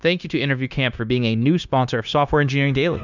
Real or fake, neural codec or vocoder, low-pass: fake; codec, 16 kHz, 0.9 kbps, LongCat-Audio-Codec; 7.2 kHz